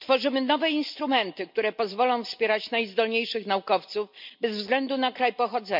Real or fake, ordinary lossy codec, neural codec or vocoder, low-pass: real; MP3, 48 kbps; none; 5.4 kHz